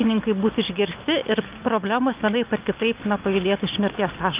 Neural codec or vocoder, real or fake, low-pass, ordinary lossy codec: none; real; 3.6 kHz; Opus, 24 kbps